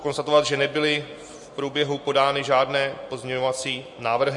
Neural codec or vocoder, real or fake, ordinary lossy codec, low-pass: none; real; MP3, 48 kbps; 10.8 kHz